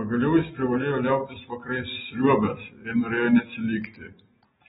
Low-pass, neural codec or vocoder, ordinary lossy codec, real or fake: 7.2 kHz; none; AAC, 16 kbps; real